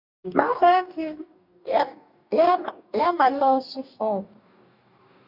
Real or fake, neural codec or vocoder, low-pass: fake; codec, 44.1 kHz, 2.6 kbps, DAC; 5.4 kHz